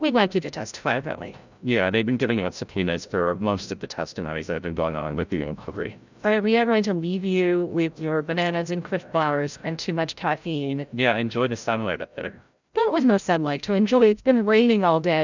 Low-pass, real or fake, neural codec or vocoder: 7.2 kHz; fake; codec, 16 kHz, 0.5 kbps, FreqCodec, larger model